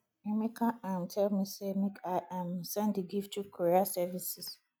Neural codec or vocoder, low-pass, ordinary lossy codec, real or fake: none; none; none; real